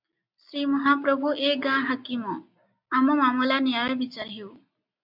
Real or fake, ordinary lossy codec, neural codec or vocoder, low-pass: real; AAC, 48 kbps; none; 5.4 kHz